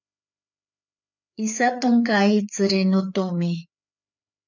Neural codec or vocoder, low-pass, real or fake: codec, 16 kHz, 4 kbps, FreqCodec, larger model; 7.2 kHz; fake